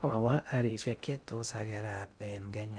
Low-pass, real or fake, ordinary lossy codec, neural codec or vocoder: 9.9 kHz; fake; none; codec, 16 kHz in and 24 kHz out, 0.6 kbps, FocalCodec, streaming, 2048 codes